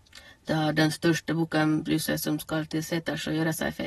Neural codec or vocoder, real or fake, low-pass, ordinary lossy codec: none; real; 19.8 kHz; AAC, 32 kbps